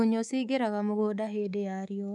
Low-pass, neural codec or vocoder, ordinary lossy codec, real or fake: none; codec, 24 kHz, 3.1 kbps, DualCodec; none; fake